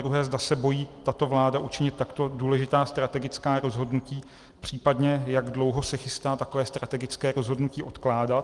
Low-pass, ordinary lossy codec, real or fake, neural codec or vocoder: 10.8 kHz; Opus, 24 kbps; real; none